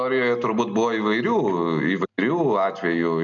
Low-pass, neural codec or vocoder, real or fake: 7.2 kHz; none; real